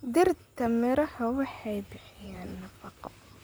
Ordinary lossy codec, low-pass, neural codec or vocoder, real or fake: none; none; vocoder, 44.1 kHz, 128 mel bands, Pupu-Vocoder; fake